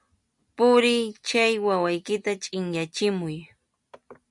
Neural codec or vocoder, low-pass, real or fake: none; 10.8 kHz; real